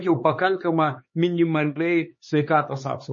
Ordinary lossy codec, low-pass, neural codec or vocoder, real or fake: MP3, 32 kbps; 7.2 kHz; codec, 16 kHz, 4 kbps, X-Codec, HuBERT features, trained on LibriSpeech; fake